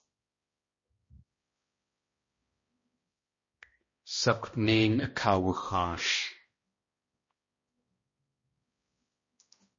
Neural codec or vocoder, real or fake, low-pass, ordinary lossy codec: codec, 16 kHz, 1 kbps, X-Codec, HuBERT features, trained on balanced general audio; fake; 7.2 kHz; MP3, 32 kbps